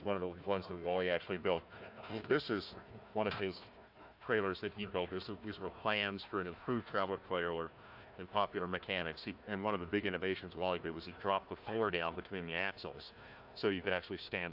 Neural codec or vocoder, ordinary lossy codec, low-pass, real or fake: codec, 16 kHz, 1 kbps, FunCodec, trained on Chinese and English, 50 frames a second; AAC, 48 kbps; 5.4 kHz; fake